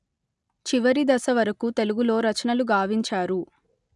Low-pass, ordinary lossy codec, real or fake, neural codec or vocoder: 10.8 kHz; none; real; none